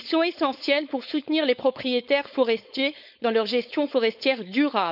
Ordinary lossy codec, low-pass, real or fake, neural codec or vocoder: none; 5.4 kHz; fake; codec, 16 kHz, 4.8 kbps, FACodec